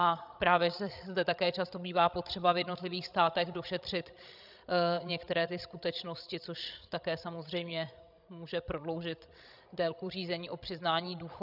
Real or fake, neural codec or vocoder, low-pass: fake; codec, 16 kHz, 16 kbps, FreqCodec, larger model; 5.4 kHz